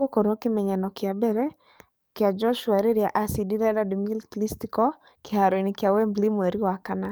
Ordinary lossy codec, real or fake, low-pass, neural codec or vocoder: none; fake; none; codec, 44.1 kHz, 7.8 kbps, DAC